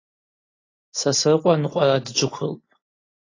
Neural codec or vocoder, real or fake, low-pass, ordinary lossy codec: none; real; 7.2 kHz; AAC, 32 kbps